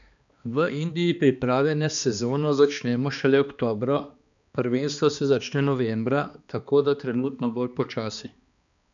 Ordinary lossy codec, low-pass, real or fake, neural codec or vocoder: none; 7.2 kHz; fake; codec, 16 kHz, 2 kbps, X-Codec, HuBERT features, trained on balanced general audio